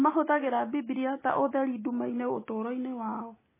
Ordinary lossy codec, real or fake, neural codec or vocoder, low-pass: MP3, 16 kbps; real; none; 3.6 kHz